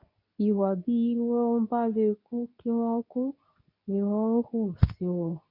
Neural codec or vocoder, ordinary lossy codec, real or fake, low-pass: codec, 24 kHz, 0.9 kbps, WavTokenizer, medium speech release version 2; none; fake; 5.4 kHz